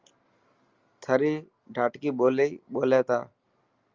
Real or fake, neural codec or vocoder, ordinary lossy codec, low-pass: real; none; Opus, 32 kbps; 7.2 kHz